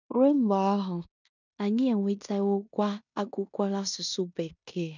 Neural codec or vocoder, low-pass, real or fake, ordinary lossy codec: codec, 16 kHz in and 24 kHz out, 0.9 kbps, LongCat-Audio-Codec, fine tuned four codebook decoder; 7.2 kHz; fake; none